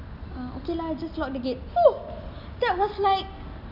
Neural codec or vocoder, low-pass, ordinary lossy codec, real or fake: none; 5.4 kHz; none; real